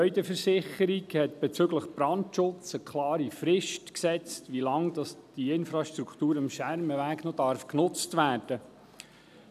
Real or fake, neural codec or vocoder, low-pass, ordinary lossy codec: real; none; 14.4 kHz; none